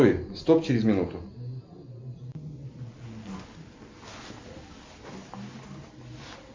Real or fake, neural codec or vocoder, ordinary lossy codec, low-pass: real; none; MP3, 64 kbps; 7.2 kHz